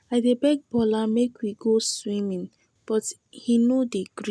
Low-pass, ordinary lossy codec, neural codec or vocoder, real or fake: none; none; none; real